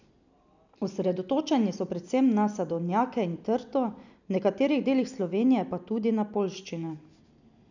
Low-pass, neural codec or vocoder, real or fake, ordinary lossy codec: 7.2 kHz; none; real; none